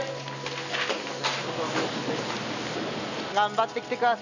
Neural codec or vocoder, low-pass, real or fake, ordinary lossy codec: none; 7.2 kHz; real; none